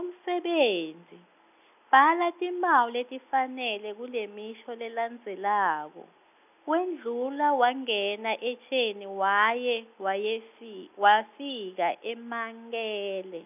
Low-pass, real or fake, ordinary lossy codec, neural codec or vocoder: 3.6 kHz; real; none; none